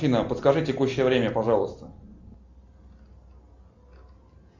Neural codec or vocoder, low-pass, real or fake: none; 7.2 kHz; real